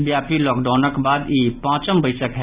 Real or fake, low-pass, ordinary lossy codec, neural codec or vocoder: real; 3.6 kHz; Opus, 64 kbps; none